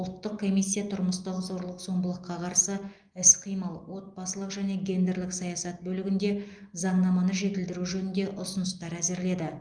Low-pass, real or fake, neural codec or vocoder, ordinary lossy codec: 9.9 kHz; real; none; Opus, 32 kbps